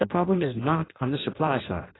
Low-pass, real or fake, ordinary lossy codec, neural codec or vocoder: 7.2 kHz; fake; AAC, 16 kbps; codec, 16 kHz in and 24 kHz out, 0.6 kbps, FireRedTTS-2 codec